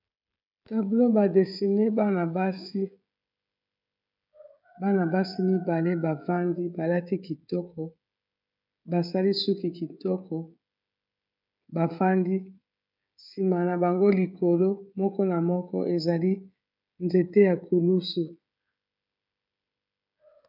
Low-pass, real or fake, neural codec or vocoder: 5.4 kHz; fake; codec, 16 kHz, 16 kbps, FreqCodec, smaller model